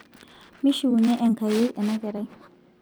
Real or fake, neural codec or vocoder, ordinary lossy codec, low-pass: fake; vocoder, 44.1 kHz, 128 mel bands every 256 samples, BigVGAN v2; none; none